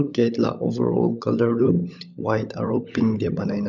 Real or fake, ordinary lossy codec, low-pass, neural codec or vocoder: fake; none; 7.2 kHz; codec, 16 kHz, 16 kbps, FunCodec, trained on LibriTTS, 50 frames a second